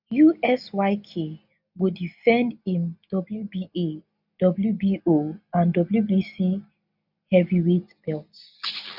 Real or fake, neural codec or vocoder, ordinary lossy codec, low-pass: real; none; none; 5.4 kHz